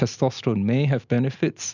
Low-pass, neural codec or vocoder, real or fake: 7.2 kHz; none; real